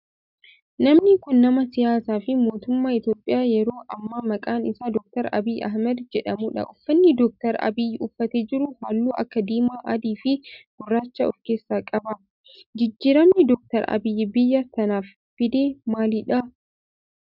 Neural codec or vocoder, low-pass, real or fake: none; 5.4 kHz; real